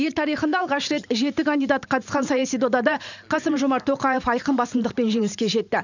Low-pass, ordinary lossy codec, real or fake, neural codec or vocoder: 7.2 kHz; none; fake; vocoder, 44.1 kHz, 128 mel bands every 512 samples, BigVGAN v2